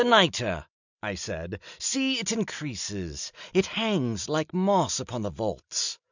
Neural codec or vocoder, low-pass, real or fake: none; 7.2 kHz; real